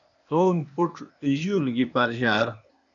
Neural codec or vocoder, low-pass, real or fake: codec, 16 kHz, 0.8 kbps, ZipCodec; 7.2 kHz; fake